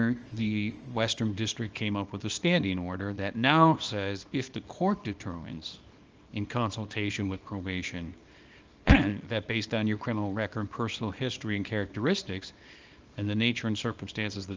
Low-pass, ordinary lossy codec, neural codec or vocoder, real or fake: 7.2 kHz; Opus, 24 kbps; codec, 24 kHz, 0.9 kbps, WavTokenizer, small release; fake